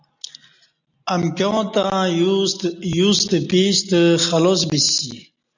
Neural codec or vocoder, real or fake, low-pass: none; real; 7.2 kHz